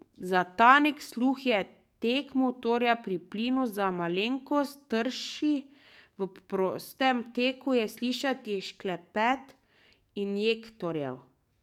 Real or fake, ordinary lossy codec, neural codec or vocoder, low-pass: fake; none; codec, 44.1 kHz, 7.8 kbps, DAC; 19.8 kHz